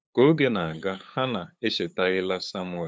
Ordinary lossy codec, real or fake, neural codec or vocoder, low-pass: none; fake; codec, 16 kHz, 8 kbps, FunCodec, trained on LibriTTS, 25 frames a second; none